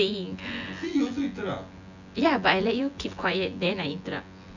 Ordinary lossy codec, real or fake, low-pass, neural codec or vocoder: none; fake; 7.2 kHz; vocoder, 24 kHz, 100 mel bands, Vocos